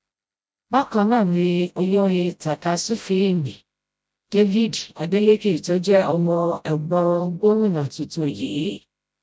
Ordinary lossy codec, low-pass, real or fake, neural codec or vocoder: none; none; fake; codec, 16 kHz, 0.5 kbps, FreqCodec, smaller model